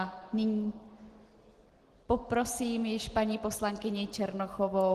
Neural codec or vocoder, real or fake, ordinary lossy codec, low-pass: none; real; Opus, 16 kbps; 14.4 kHz